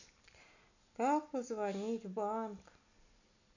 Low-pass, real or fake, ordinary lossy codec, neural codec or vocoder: 7.2 kHz; real; none; none